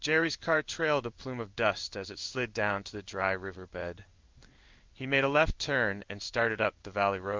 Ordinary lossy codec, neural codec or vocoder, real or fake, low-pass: Opus, 24 kbps; codec, 16 kHz in and 24 kHz out, 1 kbps, XY-Tokenizer; fake; 7.2 kHz